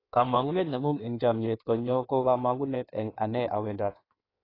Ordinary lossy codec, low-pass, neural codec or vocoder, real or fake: AAC, 24 kbps; 5.4 kHz; codec, 16 kHz in and 24 kHz out, 1.1 kbps, FireRedTTS-2 codec; fake